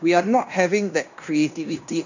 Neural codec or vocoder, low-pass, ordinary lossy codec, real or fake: codec, 16 kHz in and 24 kHz out, 0.9 kbps, LongCat-Audio-Codec, fine tuned four codebook decoder; 7.2 kHz; none; fake